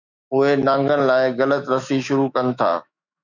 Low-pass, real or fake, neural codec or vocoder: 7.2 kHz; fake; autoencoder, 48 kHz, 128 numbers a frame, DAC-VAE, trained on Japanese speech